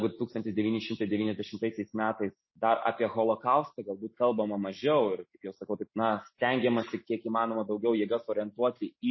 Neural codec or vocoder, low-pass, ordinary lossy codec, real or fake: none; 7.2 kHz; MP3, 24 kbps; real